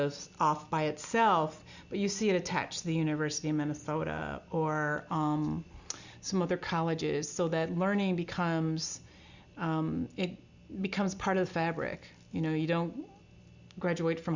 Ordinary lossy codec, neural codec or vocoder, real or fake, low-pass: Opus, 64 kbps; none; real; 7.2 kHz